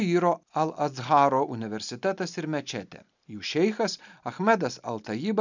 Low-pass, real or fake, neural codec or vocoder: 7.2 kHz; real; none